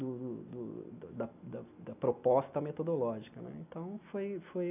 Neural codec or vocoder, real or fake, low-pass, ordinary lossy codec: none; real; 3.6 kHz; none